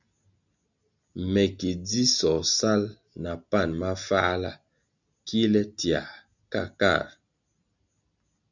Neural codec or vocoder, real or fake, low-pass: none; real; 7.2 kHz